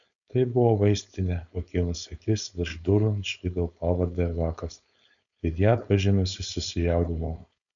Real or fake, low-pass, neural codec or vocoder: fake; 7.2 kHz; codec, 16 kHz, 4.8 kbps, FACodec